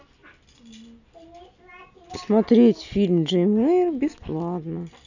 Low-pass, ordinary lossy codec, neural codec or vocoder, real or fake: 7.2 kHz; none; none; real